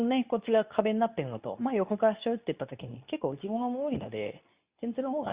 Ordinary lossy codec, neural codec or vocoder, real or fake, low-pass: Opus, 64 kbps; codec, 24 kHz, 0.9 kbps, WavTokenizer, medium speech release version 2; fake; 3.6 kHz